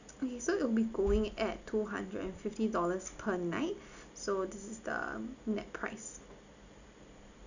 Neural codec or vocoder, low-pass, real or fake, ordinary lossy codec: none; 7.2 kHz; real; none